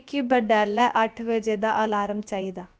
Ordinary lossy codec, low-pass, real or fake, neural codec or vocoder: none; none; fake; codec, 16 kHz, about 1 kbps, DyCAST, with the encoder's durations